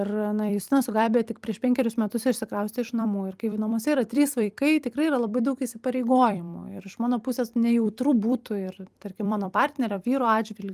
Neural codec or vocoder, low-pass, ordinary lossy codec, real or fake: vocoder, 44.1 kHz, 128 mel bands every 256 samples, BigVGAN v2; 14.4 kHz; Opus, 32 kbps; fake